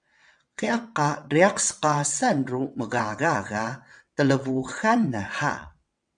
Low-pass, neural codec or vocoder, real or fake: 9.9 kHz; vocoder, 22.05 kHz, 80 mel bands, WaveNeXt; fake